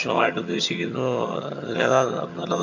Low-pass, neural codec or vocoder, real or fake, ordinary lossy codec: 7.2 kHz; vocoder, 22.05 kHz, 80 mel bands, HiFi-GAN; fake; none